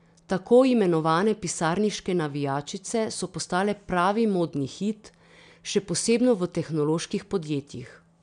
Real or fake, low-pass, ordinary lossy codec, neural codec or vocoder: real; 9.9 kHz; none; none